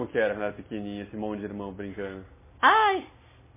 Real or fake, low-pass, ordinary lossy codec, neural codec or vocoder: real; 3.6 kHz; MP3, 16 kbps; none